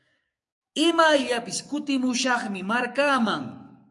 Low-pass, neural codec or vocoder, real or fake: 10.8 kHz; codec, 44.1 kHz, 7.8 kbps, DAC; fake